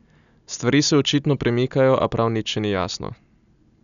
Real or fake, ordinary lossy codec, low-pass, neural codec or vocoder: real; none; 7.2 kHz; none